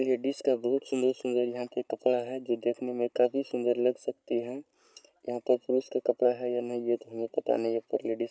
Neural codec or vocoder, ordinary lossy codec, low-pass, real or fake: none; none; none; real